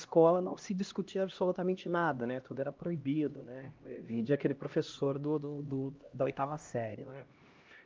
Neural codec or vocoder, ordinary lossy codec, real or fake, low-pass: codec, 16 kHz, 1 kbps, X-Codec, HuBERT features, trained on LibriSpeech; Opus, 32 kbps; fake; 7.2 kHz